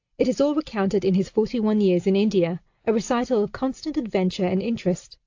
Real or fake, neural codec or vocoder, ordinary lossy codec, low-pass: real; none; AAC, 48 kbps; 7.2 kHz